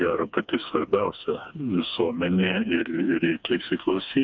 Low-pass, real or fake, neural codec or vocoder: 7.2 kHz; fake; codec, 16 kHz, 2 kbps, FreqCodec, smaller model